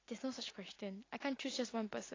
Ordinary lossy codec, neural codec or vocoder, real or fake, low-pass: AAC, 32 kbps; none; real; 7.2 kHz